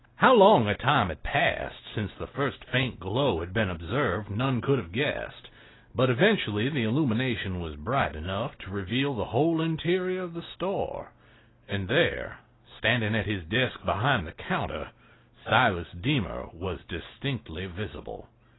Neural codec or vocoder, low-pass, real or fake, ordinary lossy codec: none; 7.2 kHz; real; AAC, 16 kbps